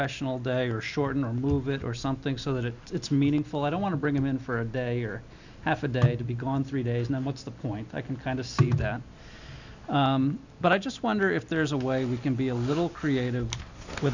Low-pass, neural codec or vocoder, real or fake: 7.2 kHz; none; real